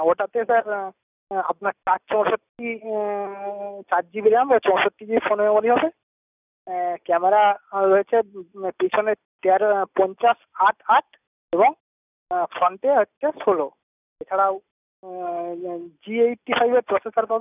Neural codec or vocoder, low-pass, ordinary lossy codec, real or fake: none; 3.6 kHz; none; real